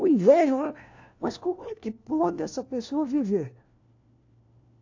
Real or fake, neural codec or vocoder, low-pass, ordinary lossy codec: fake; codec, 16 kHz, 1 kbps, FunCodec, trained on LibriTTS, 50 frames a second; 7.2 kHz; AAC, 48 kbps